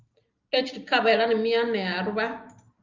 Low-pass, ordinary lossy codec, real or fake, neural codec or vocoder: 7.2 kHz; Opus, 24 kbps; real; none